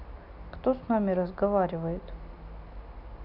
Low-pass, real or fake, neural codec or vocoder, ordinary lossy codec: 5.4 kHz; real; none; none